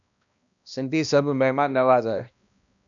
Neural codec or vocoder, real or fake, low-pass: codec, 16 kHz, 1 kbps, X-Codec, HuBERT features, trained on balanced general audio; fake; 7.2 kHz